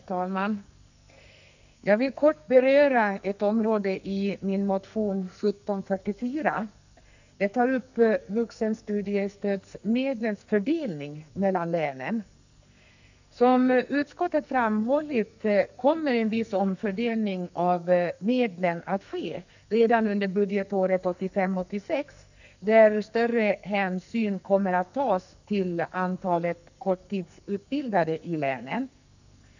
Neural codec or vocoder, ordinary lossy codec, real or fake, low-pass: codec, 44.1 kHz, 2.6 kbps, SNAC; none; fake; 7.2 kHz